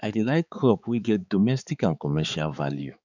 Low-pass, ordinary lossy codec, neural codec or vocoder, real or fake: 7.2 kHz; none; codec, 16 kHz, 4 kbps, X-Codec, HuBERT features, trained on balanced general audio; fake